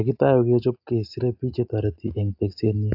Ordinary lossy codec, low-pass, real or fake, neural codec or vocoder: AAC, 48 kbps; 5.4 kHz; real; none